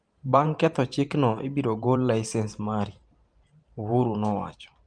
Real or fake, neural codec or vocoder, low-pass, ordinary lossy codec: fake; vocoder, 44.1 kHz, 128 mel bands every 512 samples, BigVGAN v2; 9.9 kHz; Opus, 32 kbps